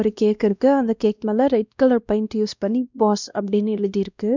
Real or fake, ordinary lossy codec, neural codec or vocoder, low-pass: fake; none; codec, 16 kHz, 1 kbps, X-Codec, WavLM features, trained on Multilingual LibriSpeech; 7.2 kHz